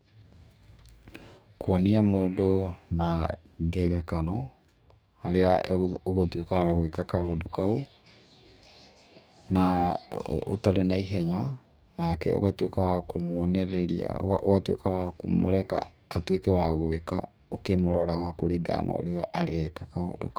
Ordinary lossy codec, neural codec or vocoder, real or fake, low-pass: none; codec, 44.1 kHz, 2.6 kbps, DAC; fake; none